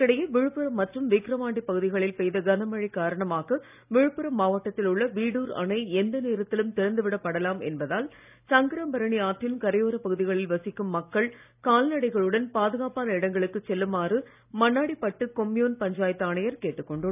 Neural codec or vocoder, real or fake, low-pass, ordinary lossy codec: none; real; 3.6 kHz; none